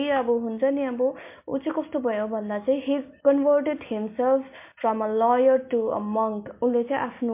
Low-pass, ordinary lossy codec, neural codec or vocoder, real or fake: 3.6 kHz; MP3, 24 kbps; none; real